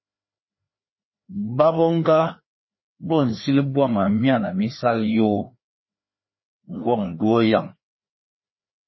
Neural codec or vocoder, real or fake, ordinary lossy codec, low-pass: codec, 16 kHz, 2 kbps, FreqCodec, larger model; fake; MP3, 24 kbps; 7.2 kHz